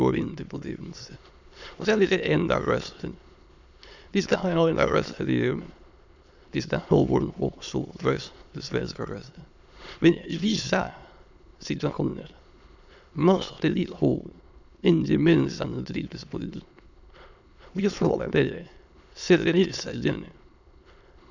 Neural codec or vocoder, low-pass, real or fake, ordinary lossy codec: autoencoder, 22.05 kHz, a latent of 192 numbers a frame, VITS, trained on many speakers; 7.2 kHz; fake; none